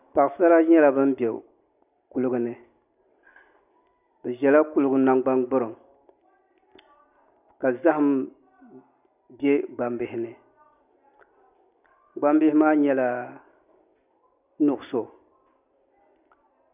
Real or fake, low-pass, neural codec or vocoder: real; 3.6 kHz; none